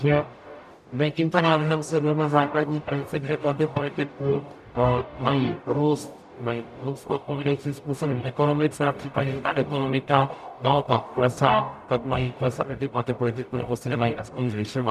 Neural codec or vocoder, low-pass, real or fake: codec, 44.1 kHz, 0.9 kbps, DAC; 14.4 kHz; fake